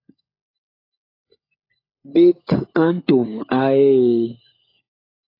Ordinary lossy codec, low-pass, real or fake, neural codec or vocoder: AAC, 24 kbps; 5.4 kHz; fake; codec, 16 kHz, 16 kbps, FunCodec, trained on LibriTTS, 50 frames a second